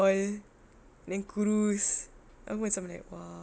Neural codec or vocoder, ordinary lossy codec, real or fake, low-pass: none; none; real; none